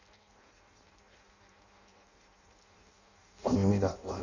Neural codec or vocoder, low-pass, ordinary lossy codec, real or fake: codec, 16 kHz in and 24 kHz out, 0.6 kbps, FireRedTTS-2 codec; 7.2 kHz; none; fake